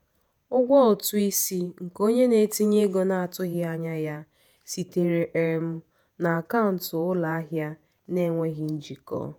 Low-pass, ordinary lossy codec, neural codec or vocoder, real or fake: none; none; vocoder, 48 kHz, 128 mel bands, Vocos; fake